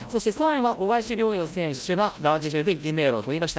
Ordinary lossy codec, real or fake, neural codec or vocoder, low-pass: none; fake; codec, 16 kHz, 0.5 kbps, FreqCodec, larger model; none